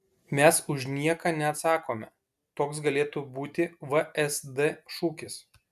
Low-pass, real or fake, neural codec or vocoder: 14.4 kHz; real; none